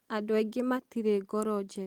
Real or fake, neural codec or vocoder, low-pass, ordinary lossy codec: real; none; 19.8 kHz; Opus, 24 kbps